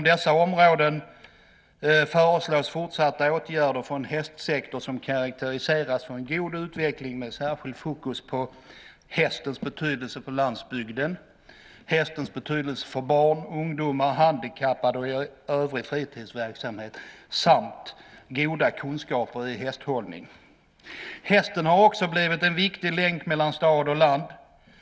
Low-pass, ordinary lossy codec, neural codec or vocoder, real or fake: none; none; none; real